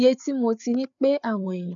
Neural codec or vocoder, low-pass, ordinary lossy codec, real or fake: codec, 16 kHz, 4 kbps, FreqCodec, larger model; 7.2 kHz; none; fake